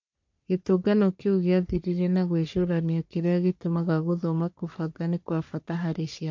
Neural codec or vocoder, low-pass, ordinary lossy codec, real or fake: codec, 44.1 kHz, 3.4 kbps, Pupu-Codec; 7.2 kHz; MP3, 48 kbps; fake